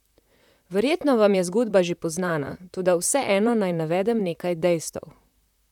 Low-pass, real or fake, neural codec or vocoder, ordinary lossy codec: 19.8 kHz; fake; vocoder, 44.1 kHz, 128 mel bands, Pupu-Vocoder; none